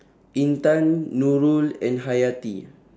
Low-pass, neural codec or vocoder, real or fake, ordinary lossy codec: none; none; real; none